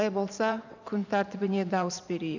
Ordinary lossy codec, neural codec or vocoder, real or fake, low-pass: none; vocoder, 44.1 kHz, 128 mel bands every 512 samples, BigVGAN v2; fake; 7.2 kHz